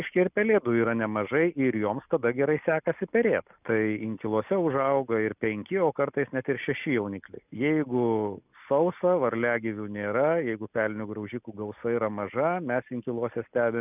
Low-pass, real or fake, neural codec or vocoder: 3.6 kHz; real; none